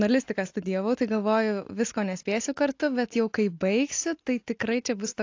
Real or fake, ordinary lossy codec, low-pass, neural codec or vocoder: real; AAC, 48 kbps; 7.2 kHz; none